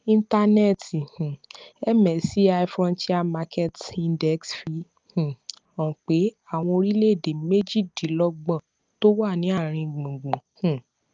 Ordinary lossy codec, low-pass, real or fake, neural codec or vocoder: Opus, 24 kbps; 7.2 kHz; real; none